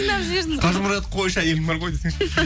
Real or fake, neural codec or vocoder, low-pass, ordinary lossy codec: real; none; none; none